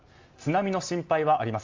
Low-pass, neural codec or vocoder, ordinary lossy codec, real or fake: 7.2 kHz; none; Opus, 32 kbps; real